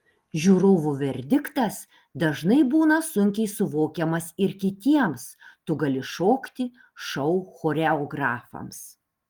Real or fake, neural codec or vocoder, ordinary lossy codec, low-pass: real; none; Opus, 24 kbps; 19.8 kHz